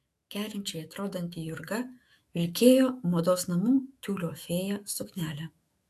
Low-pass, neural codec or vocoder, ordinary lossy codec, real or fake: 14.4 kHz; autoencoder, 48 kHz, 128 numbers a frame, DAC-VAE, trained on Japanese speech; AAC, 64 kbps; fake